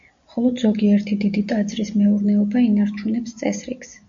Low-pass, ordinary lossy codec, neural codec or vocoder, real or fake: 7.2 kHz; AAC, 64 kbps; none; real